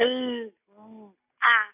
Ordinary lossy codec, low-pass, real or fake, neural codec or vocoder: none; 3.6 kHz; real; none